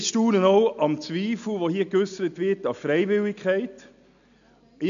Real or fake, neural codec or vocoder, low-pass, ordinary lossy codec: real; none; 7.2 kHz; none